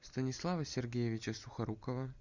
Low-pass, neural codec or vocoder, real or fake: 7.2 kHz; none; real